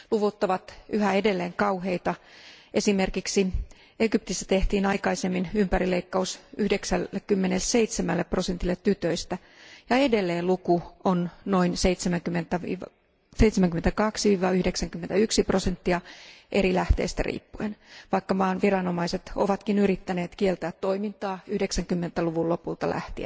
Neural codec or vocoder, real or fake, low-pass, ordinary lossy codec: none; real; none; none